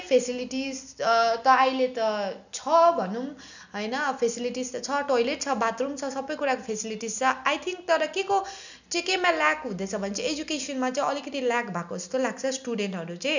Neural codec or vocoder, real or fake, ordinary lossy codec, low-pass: none; real; none; 7.2 kHz